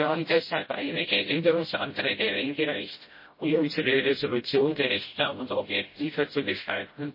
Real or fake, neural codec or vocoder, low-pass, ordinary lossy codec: fake; codec, 16 kHz, 0.5 kbps, FreqCodec, smaller model; 5.4 kHz; MP3, 24 kbps